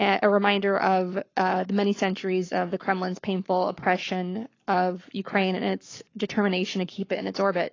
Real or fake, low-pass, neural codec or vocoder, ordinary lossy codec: fake; 7.2 kHz; codec, 44.1 kHz, 7.8 kbps, Pupu-Codec; AAC, 32 kbps